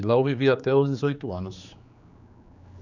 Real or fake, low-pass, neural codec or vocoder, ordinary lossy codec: fake; 7.2 kHz; codec, 16 kHz, 4 kbps, X-Codec, HuBERT features, trained on general audio; none